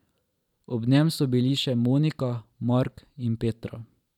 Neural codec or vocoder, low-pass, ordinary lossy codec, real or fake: vocoder, 44.1 kHz, 128 mel bands every 512 samples, BigVGAN v2; 19.8 kHz; none; fake